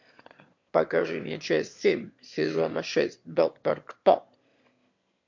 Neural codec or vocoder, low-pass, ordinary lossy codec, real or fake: autoencoder, 22.05 kHz, a latent of 192 numbers a frame, VITS, trained on one speaker; 7.2 kHz; MP3, 48 kbps; fake